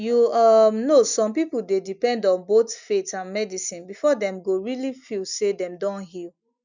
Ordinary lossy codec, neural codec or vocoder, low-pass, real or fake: none; none; 7.2 kHz; real